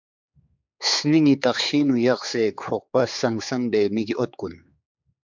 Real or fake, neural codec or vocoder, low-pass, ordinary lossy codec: fake; codec, 16 kHz, 4 kbps, X-Codec, HuBERT features, trained on general audio; 7.2 kHz; MP3, 64 kbps